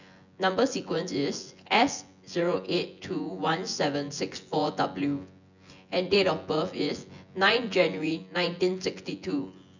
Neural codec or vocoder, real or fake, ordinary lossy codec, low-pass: vocoder, 24 kHz, 100 mel bands, Vocos; fake; none; 7.2 kHz